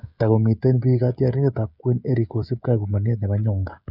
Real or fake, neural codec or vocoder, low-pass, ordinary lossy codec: fake; codec, 16 kHz, 16 kbps, FreqCodec, smaller model; 5.4 kHz; none